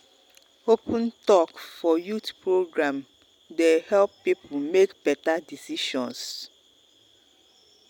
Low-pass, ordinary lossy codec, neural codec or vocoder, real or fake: 19.8 kHz; none; none; real